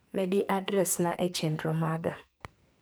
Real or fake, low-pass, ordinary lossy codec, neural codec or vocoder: fake; none; none; codec, 44.1 kHz, 2.6 kbps, SNAC